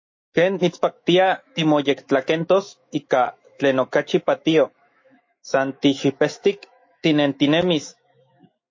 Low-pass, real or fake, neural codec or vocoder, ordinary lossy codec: 7.2 kHz; fake; autoencoder, 48 kHz, 128 numbers a frame, DAC-VAE, trained on Japanese speech; MP3, 32 kbps